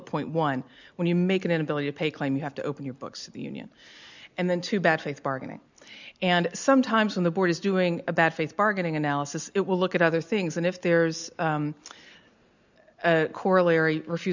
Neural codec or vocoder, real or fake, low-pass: none; real; 7.2 kHz